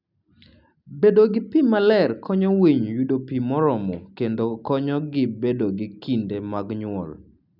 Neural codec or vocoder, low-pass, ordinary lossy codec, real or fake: none; 5.4 kHz; none; real